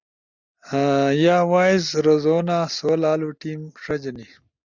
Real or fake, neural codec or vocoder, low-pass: real; none; 7.2 kHz